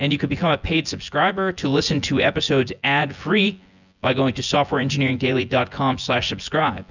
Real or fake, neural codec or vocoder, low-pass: fake; vocoder, 24 kHz, 100 mel bands, Vocos; 7.2 kHz